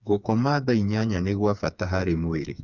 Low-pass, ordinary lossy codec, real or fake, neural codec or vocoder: 7.2 kHz; none; fake; codec, 16 kHz, 4 kbps, FreqCodec, smaller model